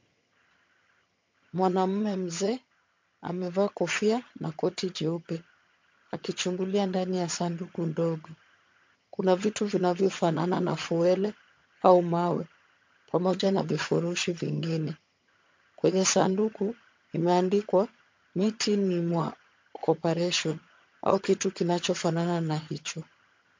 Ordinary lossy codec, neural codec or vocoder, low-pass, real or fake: MP3, 48 kbps; vocoder, 22.05 kHz, 80 mel bands, HiFi-GAN; 7.2 kHz; fake